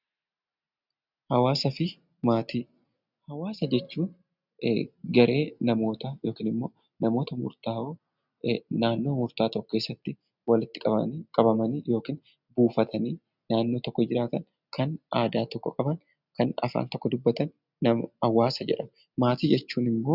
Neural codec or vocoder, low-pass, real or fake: none; 5.4 kHz; real